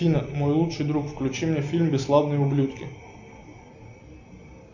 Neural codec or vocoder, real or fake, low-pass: none; real; 7.2 kHz